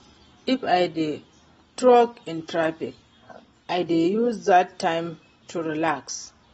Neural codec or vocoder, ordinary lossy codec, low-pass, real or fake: none; AAC, 24 kbps; 19.8 kHz; real